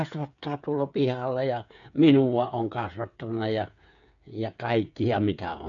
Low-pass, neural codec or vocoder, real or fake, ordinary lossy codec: 7.2 kHz; codec, 16 kHz, 8 kbps, FreqCodec, smaller model; fake; none